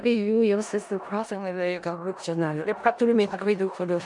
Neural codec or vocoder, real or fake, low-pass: codec, 16 kHz in and 24 kHz out, 0.4 kbps, LongCat-Audio-Codec, four codebook decoder; fake; 10.8 kHz